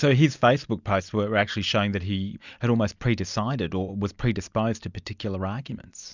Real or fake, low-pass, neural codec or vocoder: real; 7.2 kHz; none